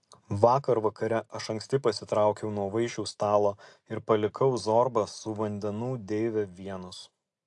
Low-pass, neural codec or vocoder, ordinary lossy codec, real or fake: 10.8 kHz; none; AAC, 64 kbps; real